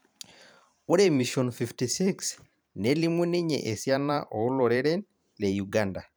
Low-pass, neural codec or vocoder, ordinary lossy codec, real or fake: none; vocoder, 44.1 kHz, 128 mel bands every 512 samples, BigVGAN v2; none; fake